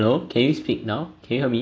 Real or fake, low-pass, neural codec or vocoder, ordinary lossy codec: real; none; none; none